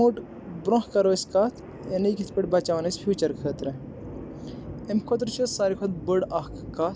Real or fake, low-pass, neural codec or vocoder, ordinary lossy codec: real; none; none; none